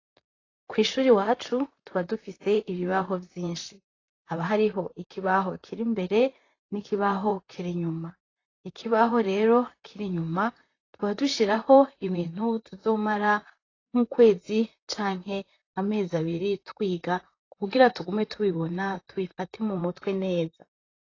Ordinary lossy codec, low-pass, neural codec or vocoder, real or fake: AAC, 32 kbps; 7.2 kHz; vocoder, 44.1 kHz, 128 mel bands, Pupu-Vocoder; fake